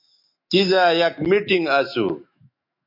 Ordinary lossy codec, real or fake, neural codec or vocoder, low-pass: MP3, 32 kbps; fake; autoencoder, 48 kHz, 128 numbers a frame, DAC-VAE, trained on Japanese speech; 5.4 kHz